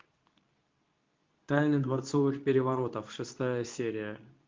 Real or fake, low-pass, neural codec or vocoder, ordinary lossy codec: fake; 7.2 kHz; codec, 24 kHz, 0.9 kbps, WavTokenizer, medium speech release version 2; Opus, 24 kbps